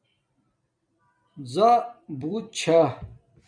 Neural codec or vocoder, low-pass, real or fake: vocoder, 44.1 kHz, 128 mel bands every 256 samples, BigVGAN v2; 9.9 kHz; fake